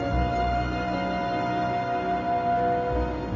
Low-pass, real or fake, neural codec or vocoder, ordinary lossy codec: 7.2 kHz; real; none; none